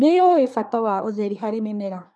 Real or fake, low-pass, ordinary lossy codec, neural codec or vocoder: fake; none; none; codec, 24 kHz, 1 kbps, SNAC